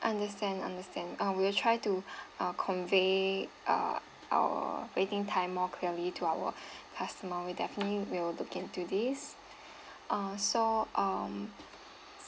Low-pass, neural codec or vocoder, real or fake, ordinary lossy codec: none; none; real; none